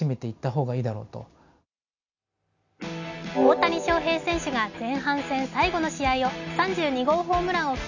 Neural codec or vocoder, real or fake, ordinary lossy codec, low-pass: none; real; none; 7.2 kHz